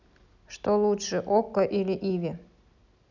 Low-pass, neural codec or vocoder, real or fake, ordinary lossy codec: 7.2 kHz; none; real; none